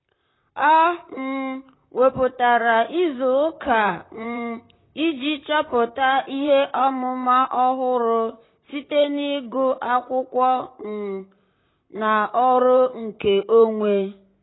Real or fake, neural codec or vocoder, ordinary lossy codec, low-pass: fake; vocoder, 44.1 kHz, 128 mel bands, Pupu-Vocoder; AAC, 16 kbps; 7.2 kHz